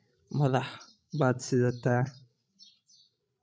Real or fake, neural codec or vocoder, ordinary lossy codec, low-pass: fake; codec, 16 kHz, 16 kbps, FreqCodec, larger model; none; none